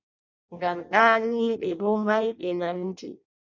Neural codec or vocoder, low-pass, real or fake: codec, 16 kHz in and 24 kHz out, 0.6 kbps, FireRedTTS-2 codec; 7.2 kHz; fake